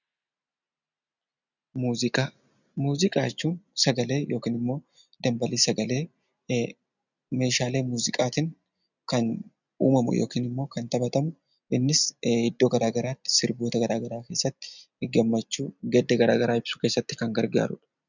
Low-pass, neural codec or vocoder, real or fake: 7.2 kHz; none; real